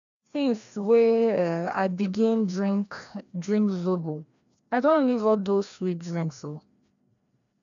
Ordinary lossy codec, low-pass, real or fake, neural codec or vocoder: none; 7.2 kHz; fake; codec, 16 kHz, 1 kbps, FreqCodec, larger model